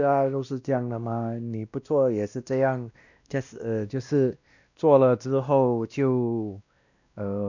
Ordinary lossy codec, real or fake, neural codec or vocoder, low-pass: none; fake; codec, 16 kHz, 1 kbps, X-Codec, WavLM features, trained on Multilingual LibriSpeech; 7.2 kHz